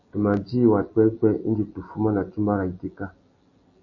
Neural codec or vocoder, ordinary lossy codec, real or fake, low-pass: none; MP3, 32 kbps; real; 7.2 kHz